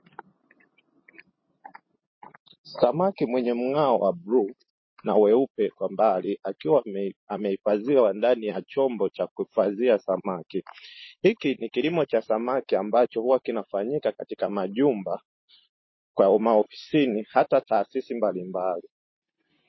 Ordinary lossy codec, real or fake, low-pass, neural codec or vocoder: MP3, 24 kbps; real; 7.2 kHz; none